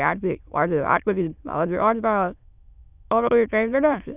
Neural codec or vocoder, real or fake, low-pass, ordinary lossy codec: autoencoder, 22.05 kHz, a latent of 192 numbers a frame, VITS, trained on many speakers; fake; 3.6 kHz; none